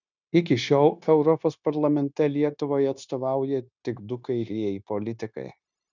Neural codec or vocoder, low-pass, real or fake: codec, 16 kHz, 0.9 kbps, LongCat-Audio-Codec; 7.2 kHz; fake